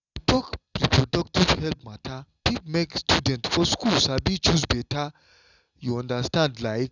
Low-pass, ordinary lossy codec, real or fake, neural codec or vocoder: 7.2 kHz; none; real; none